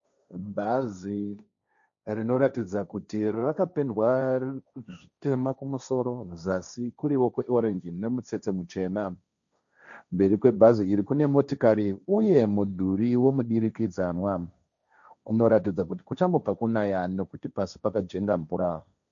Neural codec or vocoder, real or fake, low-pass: codec, 16 kHz, 1.1 kbps, Voila-Tokenizer; fake; 7.2 kHz